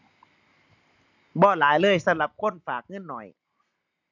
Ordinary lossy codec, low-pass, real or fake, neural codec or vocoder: none; 7.2 kHz; real; none